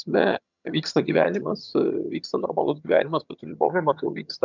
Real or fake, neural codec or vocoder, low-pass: fake; vocoder, 22.05 kHz, 80 mel bands, HiFi-GAN; 7.2 kHz